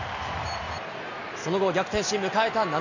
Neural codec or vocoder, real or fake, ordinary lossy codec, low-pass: none; real; none; 7.2 kHz